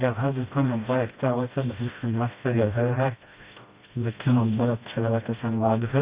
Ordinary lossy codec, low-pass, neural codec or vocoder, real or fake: Opus, 64 kbps; 3.6 kHz; codec, 16 kHz, 1 kbps, FreqCodec, smaller model; fake